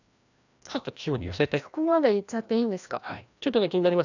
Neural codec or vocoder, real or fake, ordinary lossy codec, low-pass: codec, 16 kHz, 1 kbps, FreqCodec, larger model; fake; none; 7.2 kHz